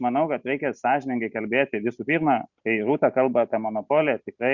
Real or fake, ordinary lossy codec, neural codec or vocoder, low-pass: real; Opus, 64 kbps; none; 7.2 kHz